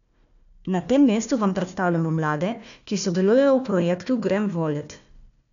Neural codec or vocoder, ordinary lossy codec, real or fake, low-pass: codec, 16 kHz, 1 kbps, FunCodec, trained on Chinese and English, 50 frames a second; none; fake; 7.2 kHz